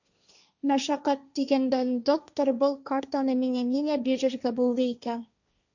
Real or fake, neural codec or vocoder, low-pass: fake; codec, 16 kHz, 1.1 kbps, Voila-Tokenizer; 7.2 kHz